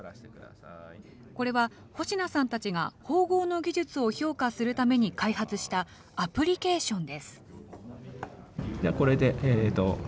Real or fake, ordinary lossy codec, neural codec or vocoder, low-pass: real; none; none; none